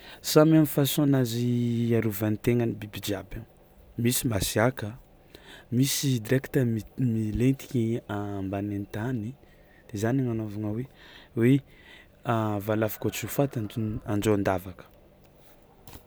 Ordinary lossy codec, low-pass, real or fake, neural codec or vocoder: none; none; real; none